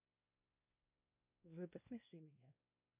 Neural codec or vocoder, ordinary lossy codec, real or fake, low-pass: codec, 16 kHz, 1 kbps, X-Codec, WavLM features, trained on Multilingual LibriSpeech; MP3, 32 kbps; fake; 3.6 kHz